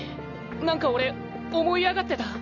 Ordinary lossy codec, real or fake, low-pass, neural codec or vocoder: none; real; 7.2 kHz; none